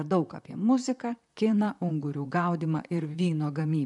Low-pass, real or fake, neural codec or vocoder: 10.8 kHz; fake; vocoder, 44.1 kHz, 128 mel bands, Pupu-Vocoder